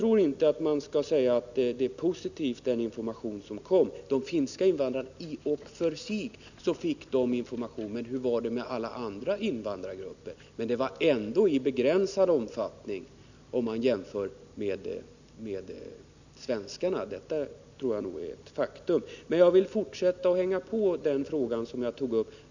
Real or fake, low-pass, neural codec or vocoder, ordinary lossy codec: real; 7.2 kHz; none; none